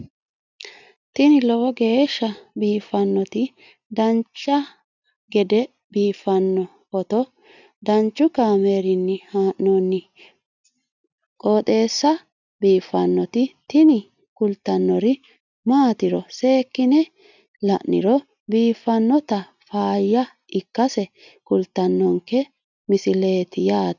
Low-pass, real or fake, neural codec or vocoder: 7.2 kHz; real; none